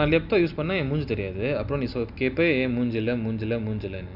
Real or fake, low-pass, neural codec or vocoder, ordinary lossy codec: real; 5.4 kHz; none; none